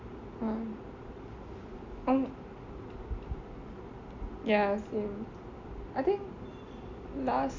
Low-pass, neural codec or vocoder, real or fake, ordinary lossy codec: 7.2 kHz; none; real; MP3, 64 kbps